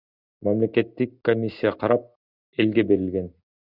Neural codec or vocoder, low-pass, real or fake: none; 5.4 kHz; real